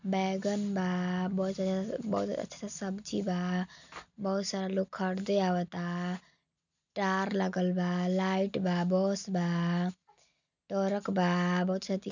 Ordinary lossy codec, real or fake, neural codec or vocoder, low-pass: none; real; none; 7.2 kHz